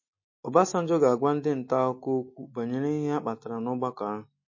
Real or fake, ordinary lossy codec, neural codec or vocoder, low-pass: real; MP3, 32 kbps; none; 7.2 kHz